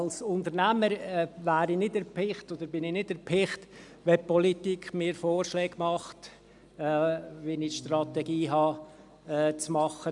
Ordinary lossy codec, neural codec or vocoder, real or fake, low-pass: none; none; real; 10.8 kHz